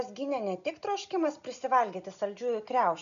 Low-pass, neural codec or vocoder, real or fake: 7.2 kHz; none; real